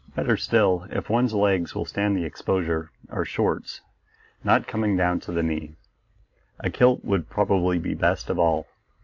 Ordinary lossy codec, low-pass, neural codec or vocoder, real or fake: AAC, 48 kbps; 7.2 kHz; none; real